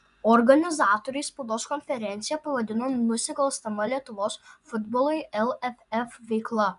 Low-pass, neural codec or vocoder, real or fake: 10.8 kHz; vocoder, 24 kHz, 100 mel bands, Vocos; fake